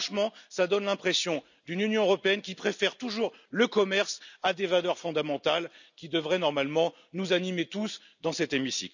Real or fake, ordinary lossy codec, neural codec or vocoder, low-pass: real; none; none; 7.2 kHz